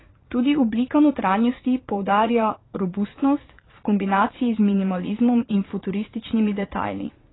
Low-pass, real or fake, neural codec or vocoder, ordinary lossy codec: 7.2 kHz; fake; vocoder, 22.05 kHz, 80 mel bands, Vocos; AAC, 16 kbps